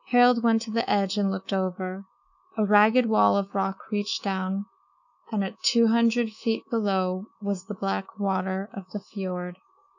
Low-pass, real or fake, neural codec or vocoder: 7.2 kHz; fake; codec, 24 kHz, 3.1 kbps, DualCodec